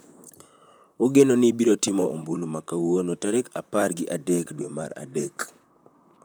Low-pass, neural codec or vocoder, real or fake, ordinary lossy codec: none; vocoder, 44.1 kHz, 128 mel bands, Pupu-Vocoder; fake; none